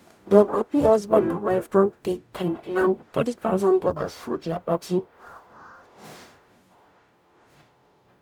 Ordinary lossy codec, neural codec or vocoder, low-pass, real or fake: none; codec, 44.1 kHz, 0.9 kbps, DAC; 19.8 kHz; fake